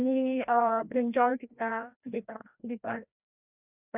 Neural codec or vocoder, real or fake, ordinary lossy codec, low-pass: codec, 16 kHz, 1 kbps, FreqCodec, larger model; fake; none; 3.6 kHz